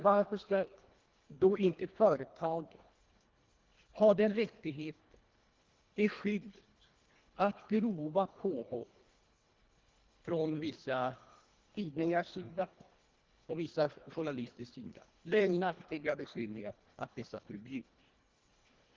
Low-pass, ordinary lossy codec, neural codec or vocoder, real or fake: 7.2 kHz; Opus, 16 kbps; codec, 24 kHz, 1.5 kbps, HILCodec; fake